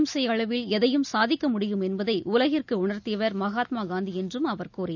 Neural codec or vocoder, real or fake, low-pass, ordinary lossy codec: none; real; 7.2 kHz; none